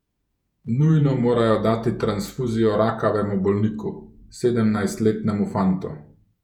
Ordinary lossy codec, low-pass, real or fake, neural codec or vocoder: none; 19.8 kHz; fake; vocoder, 48 kHz, 128 mel bands, Vocos